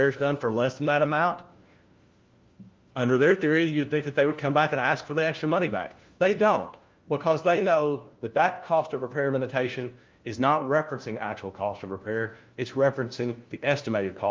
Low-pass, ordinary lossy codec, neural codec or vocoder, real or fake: 7.2 kHz; Opus, 32 kbps; codec, 16 kHz, 1 kbps, FunCodec, trained on LibriTTS, 50 frames a second; fake